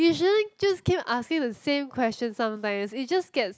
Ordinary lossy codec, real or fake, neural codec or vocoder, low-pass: none; real; none; none